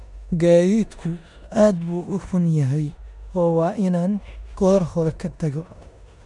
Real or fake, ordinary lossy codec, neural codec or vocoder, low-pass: fake; none; codec, 16 kHz in and 24 kHz out, 0.9 kbps, LongCat-Audio-Codec, four codebook decoder; 10.8 kHz